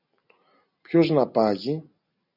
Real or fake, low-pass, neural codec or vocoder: real; 5.4 kHz; none